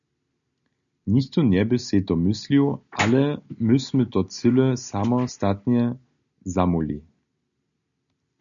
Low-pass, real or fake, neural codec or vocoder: 7.2 kHz; real; none